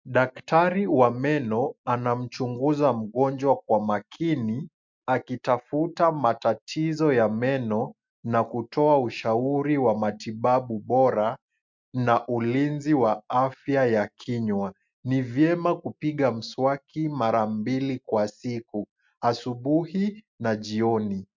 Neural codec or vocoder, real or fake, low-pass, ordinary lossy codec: none; real; 7.2 kHz; MP3, 64 kbps